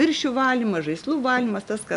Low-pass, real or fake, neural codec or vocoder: 10.8 kHz; real; none